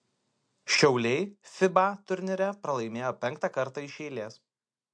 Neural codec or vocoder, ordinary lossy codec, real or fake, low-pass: none; MP3, 64 kbps; real; 9.9 kHz